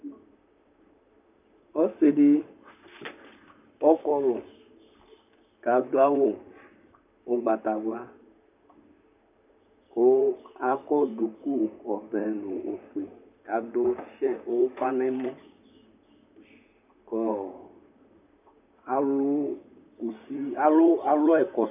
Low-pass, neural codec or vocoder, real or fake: 3.6 kHz; vocoder, 44.1 kHz, 128 mel bands, Pupu-Vocoder; fake